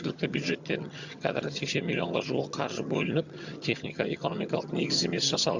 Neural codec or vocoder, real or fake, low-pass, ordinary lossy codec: vocoder, 22.05 kHz, 80 mel bands, HiFi-GAN; fake; 7.2 kHz; Opus, 64 kbps